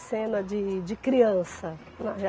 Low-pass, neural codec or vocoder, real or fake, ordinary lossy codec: none; none; real; none